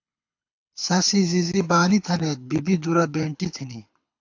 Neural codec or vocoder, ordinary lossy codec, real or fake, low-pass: codec, 24 kHz, 6 kbps, HILCodec; AAC, 48 kbps; fake; 7.2 kHz